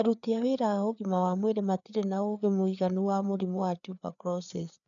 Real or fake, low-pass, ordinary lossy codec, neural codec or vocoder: fake; 7.2 kHz; none; codec, 16 kHz, 4 kbps, FreqCodec, larger model